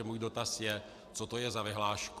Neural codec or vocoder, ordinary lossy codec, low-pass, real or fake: none; AAC, 96 kbps; 14.4 kHz; real